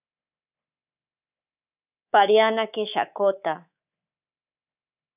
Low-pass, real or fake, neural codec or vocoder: 3.6 kHz; fake; codec, 24 kHz, 3.1 kbps, DualCodec